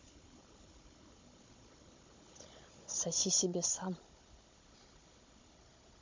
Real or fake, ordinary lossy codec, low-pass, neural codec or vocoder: fake; MP3, 48 kbps; 7.2 kHz; codec, 16 kHz, 16 kbps, FunCodec, trained on Chinese and English, 50 frames a second